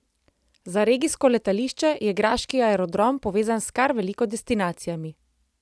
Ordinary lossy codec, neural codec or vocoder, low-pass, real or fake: none; none; none; real